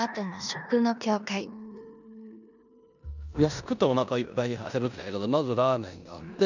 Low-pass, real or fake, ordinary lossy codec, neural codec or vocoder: 7.2 kHz; fake; none; codec, 16 kHz in and 24 kHz out, 0.9 kbps, LongCat-Audio-Codec, four codebook decoder